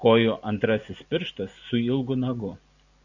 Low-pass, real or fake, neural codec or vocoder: 7.2 kHz; real; none